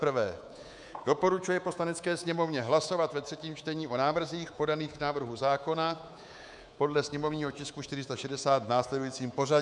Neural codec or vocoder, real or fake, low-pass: codec, 24 kHz, 3.1 kbps, DualCodec; fake; 10.8 kHz